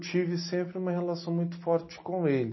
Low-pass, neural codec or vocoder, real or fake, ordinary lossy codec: 7.2 kHz; none; real; MP3, 24 kbps